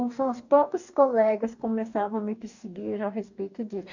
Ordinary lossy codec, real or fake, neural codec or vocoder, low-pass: none; fake; codec, 44.1 kHz, 2.6 kbps, DAC; 7.2 kHz